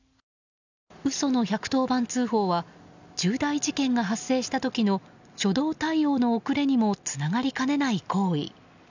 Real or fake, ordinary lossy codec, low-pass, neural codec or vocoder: real; none; 7.2 kHz; none